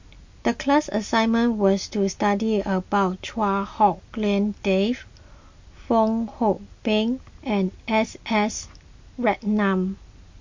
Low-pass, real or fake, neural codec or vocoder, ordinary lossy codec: 7.2 kHz; real; none; MP3, 48 kbps